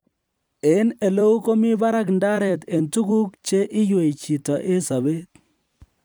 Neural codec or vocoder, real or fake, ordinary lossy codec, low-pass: vocoder, 44.1 kHz, 128 mel bands every 256 samples, BigVGAN v2; fake; none; none